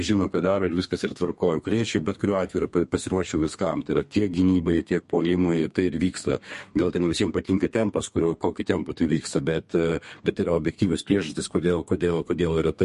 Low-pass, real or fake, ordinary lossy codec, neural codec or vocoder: 14.4 kHz; fake; MP3, 48 kbps; codec, 32 kHz, 1.9 kbps, SNAC